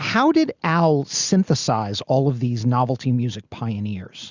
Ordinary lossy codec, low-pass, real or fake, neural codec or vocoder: Opus, 64 kbps; 7.2 kHz; real; none